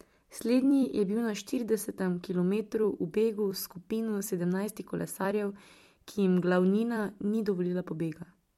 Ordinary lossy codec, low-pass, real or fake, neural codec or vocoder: MP3, 64 kbps; 19.8 kHz; fake; vocoder, 44.1 kHz, 128 mel bands every 256 samples, BigVGAN v2